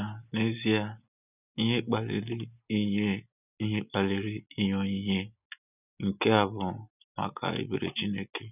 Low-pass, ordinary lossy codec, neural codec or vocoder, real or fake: 3.6 kHz; none; none; real